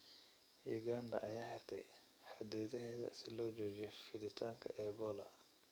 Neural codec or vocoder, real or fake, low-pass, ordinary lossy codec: codec, 44.1 kHz, 7.8 kbps, DAC; fake; none; none